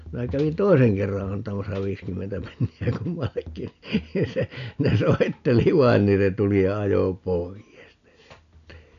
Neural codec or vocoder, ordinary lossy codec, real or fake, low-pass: none; none; real; 7.2 kHz